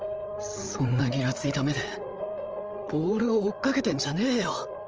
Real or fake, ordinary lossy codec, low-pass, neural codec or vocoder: fake; Opus, 24 kbps; 7.2 kHz; codec, 16 kHz, 8 kbps, FreqCodec, larger model